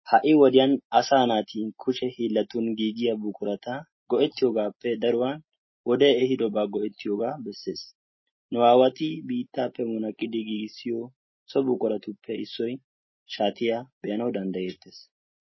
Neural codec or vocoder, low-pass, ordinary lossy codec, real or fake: none; 7.2 kHz; MP3, 24 kbps; real